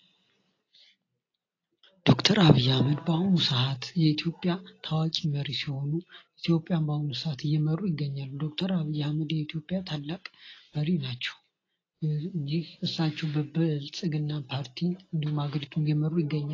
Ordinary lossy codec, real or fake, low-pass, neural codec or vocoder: AAC, 32 kbps; real; 7.2 kHz; none